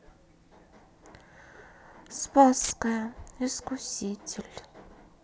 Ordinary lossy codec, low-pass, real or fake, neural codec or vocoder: none; none; real; none